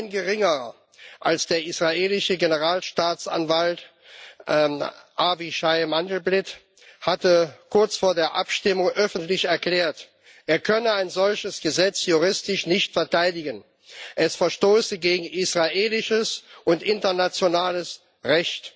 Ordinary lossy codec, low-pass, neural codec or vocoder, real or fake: none; none; none; real